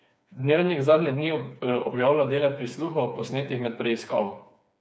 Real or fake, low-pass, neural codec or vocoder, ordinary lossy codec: fake; none; codec, 16 kHz, 4 kbps, FreqCodec, smaller model; none